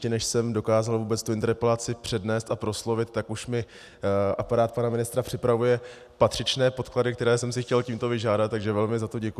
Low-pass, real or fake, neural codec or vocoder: 14.4 kHz; real; none